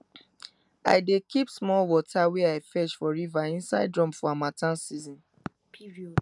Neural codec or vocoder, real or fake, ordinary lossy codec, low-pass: none; real; none; 10.8 kHz